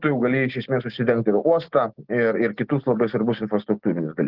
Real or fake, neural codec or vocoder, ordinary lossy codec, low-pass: real; none; Opus, 16 kbps; 5.4 kHz